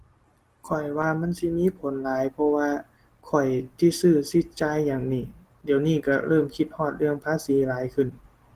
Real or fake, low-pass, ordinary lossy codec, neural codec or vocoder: real; 14.4 kHz; Opus, 16 kbps; none